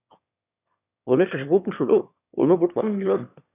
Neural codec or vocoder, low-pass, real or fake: autoencoder, 22.05 kHz, a latent of 192 numbers a frame, VITS, trained on one speaker; 3.6 kHz; fake